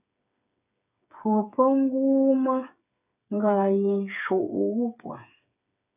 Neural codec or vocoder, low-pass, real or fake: codec, 16 kHz, 8 kbps, FreqCodec, smaller model; 3.6 kHz; fake